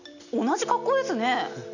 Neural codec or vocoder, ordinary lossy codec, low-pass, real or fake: none; none; 7.2 kHz; real